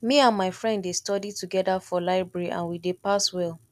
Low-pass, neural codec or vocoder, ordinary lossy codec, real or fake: 14.4 kHz; none; none; real